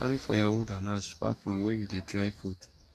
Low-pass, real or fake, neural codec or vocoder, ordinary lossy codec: 14.4 kHz; fake; codec, 44.1 kHz, 2.6 kbps, DAC; none